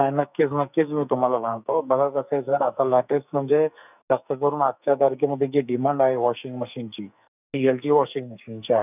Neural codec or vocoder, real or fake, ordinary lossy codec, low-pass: codec, 44.1 kHz, 2.6 kbps, SNAC; fake; none; 3.6 kHz